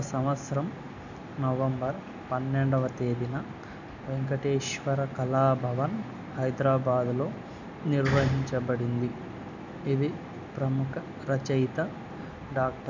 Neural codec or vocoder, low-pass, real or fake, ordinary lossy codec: none; 7.2 kHz; real; none